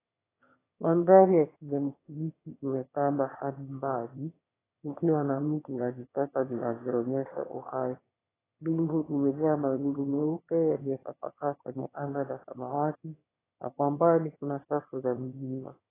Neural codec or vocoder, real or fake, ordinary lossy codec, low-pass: autoencoder, 22.05 kHz, a latent of 192 numbers a frame, VITS, trained on one speaker; fake; AAC, 16 kbps; 3.6 kHz